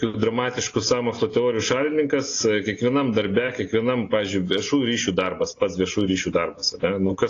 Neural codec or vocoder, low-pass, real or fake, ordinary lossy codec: none; 7.2 kHz; real; AAC, 32 kbps